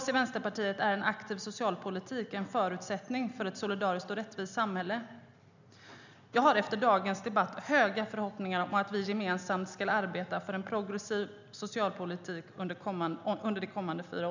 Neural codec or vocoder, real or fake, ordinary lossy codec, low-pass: none; real; none; 7.2 kHz